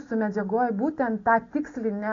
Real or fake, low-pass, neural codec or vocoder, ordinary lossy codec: real; 7.2 kHz; none; AAC, 32 kbps